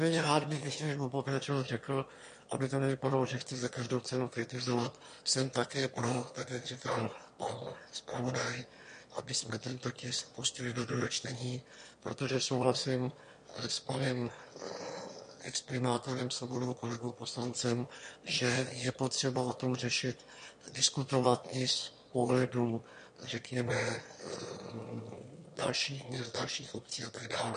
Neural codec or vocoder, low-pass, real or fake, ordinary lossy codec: autoencoder, 22.05 kHz, a latent of 192 numbers a frame, VITS, trained on one speaker; 9.9 kHz; fake; MP3, 48 kbps